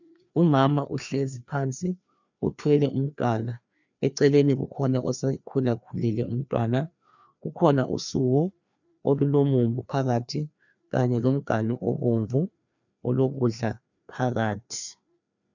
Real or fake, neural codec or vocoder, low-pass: fake; codec, 16 kHz, 2 kbps, FreqCodec, larger model; 7.2 kHz